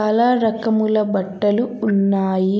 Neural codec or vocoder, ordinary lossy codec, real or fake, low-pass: none; none; real; none